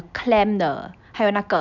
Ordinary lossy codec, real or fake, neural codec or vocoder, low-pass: none; real; none; 7.2 kHz